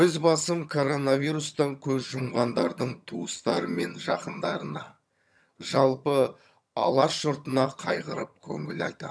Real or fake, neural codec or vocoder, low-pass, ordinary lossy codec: fake; vocoder, 22.05 kHz, 80 mel bands, HiFi-GAN; none; none